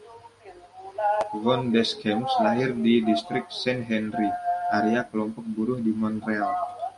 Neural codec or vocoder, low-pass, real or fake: none; 10.8 kHz; real